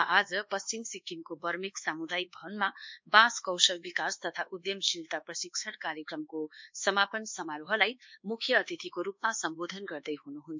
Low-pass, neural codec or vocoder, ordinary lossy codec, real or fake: 7.2 kHz; codec, 24 kHz, 1.2 kbps, DualCodec; MP3, 64 kbps; fake